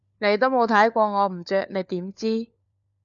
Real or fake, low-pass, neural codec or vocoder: fake; 7.2 kHz; codec, 16 kHz, 4 kbps, FunCodec, trained on LibriTTS, 50 frames a second